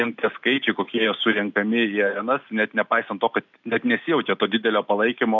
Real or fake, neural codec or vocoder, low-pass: real; none; 7.2 kHz